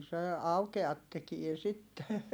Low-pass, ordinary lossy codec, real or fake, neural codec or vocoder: none; none; real; none